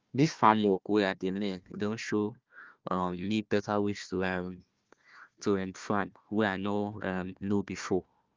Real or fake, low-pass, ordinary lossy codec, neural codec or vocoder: fake; 7.2 kHz; Opus, 24 kbps; codec, 16 kHz, 1 kbps, FunCodec, trained on Chinese and English, 50 frames a second